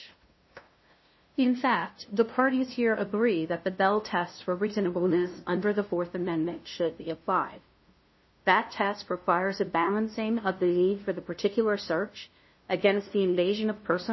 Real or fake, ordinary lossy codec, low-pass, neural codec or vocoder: fake; MP3, 24 kbps; 7.2 kHz; codec, 16 kHz, 0.5 kbps, FunCodec, trained on LibriTTS, 25 frames a second